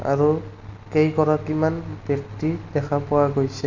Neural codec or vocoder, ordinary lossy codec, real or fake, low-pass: none; none; real; 7.2 kHz